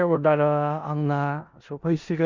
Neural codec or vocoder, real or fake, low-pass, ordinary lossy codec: codec, 16 kHz in and 24 kHz out, 0.4 kbps, LongCat-Audio-Codec, four codebook decoder; fake; 7.2 kHz; Opus, 64 kbps